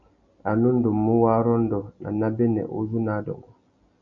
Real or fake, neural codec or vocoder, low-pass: real; none; 7.2 kHz